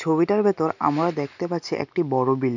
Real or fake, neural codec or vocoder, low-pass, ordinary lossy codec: real; none; 7.2 kHz; none